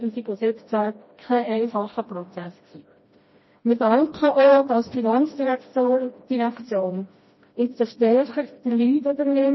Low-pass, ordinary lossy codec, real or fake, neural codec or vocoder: 7.2 kHz; MP3, 24 kbps; fake; codec, 16 kHz, 1 kbps, FreqCodec, smaller model